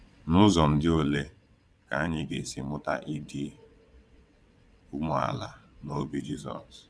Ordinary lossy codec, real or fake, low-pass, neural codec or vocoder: none; fake; none; vocoder, 22.05 kHz, 80 mel bands, WaveNeXt